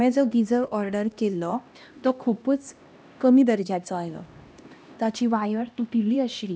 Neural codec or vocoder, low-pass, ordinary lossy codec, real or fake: codec, 16 kHz, 1 kbps, X-Codec, HuBERT features, trained on LibriSpeech; none; none; fake